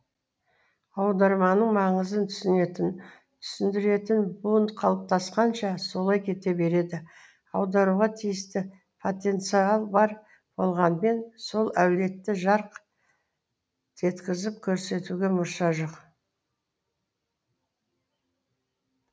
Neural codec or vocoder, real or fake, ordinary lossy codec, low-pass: none; real; none; none